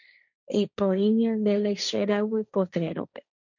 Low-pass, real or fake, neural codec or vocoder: 7.2 kHz; fake; codec, 16 kHz, 1.1 kbps, Voila-Tokenizer